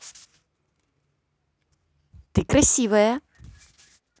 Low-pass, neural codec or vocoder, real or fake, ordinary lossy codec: none; none; real; none